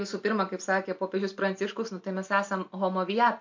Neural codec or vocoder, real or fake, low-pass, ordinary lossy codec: none; real; 7.2 kHz; MP3, 48 kbps